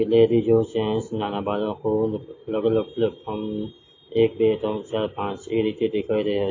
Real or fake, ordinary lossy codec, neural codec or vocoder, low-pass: real; AAC, 32 kbps; none; 7.2 kHz